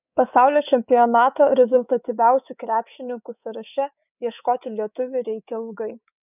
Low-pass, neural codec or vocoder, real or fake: 3.6 kHz; codec, 24 kHz, 3.1 kbps, DualCodec; fake